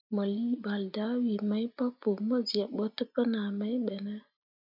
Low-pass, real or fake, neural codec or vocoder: 5.4 kHz; real; none